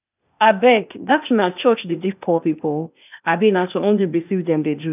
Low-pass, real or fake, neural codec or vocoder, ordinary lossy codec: 3.6 kHz; fake; codec, 16 kHz, 0.8 kbps, ZipCodec; none